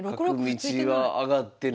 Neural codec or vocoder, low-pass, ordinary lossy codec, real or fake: none; none; none; real